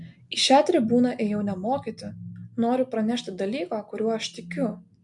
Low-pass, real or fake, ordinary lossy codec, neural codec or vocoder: 10.8 kHz; real; MP3, 64 kbps; none